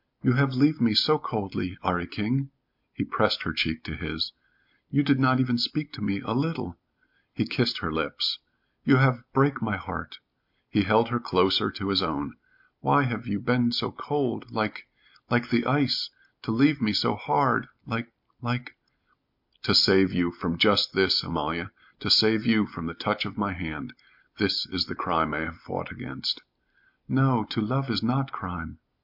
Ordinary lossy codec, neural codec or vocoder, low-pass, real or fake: MP3, 48 kbps; none; 5.4 kHz; real